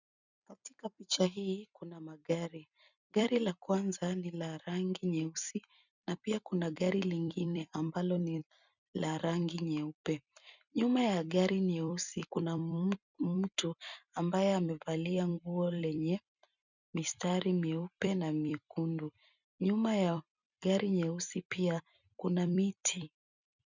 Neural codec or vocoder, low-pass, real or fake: vocoder, 44.1 kHz, 128 mel bands every 256 samples, BigVGAN v2; 7.2 kHz; fake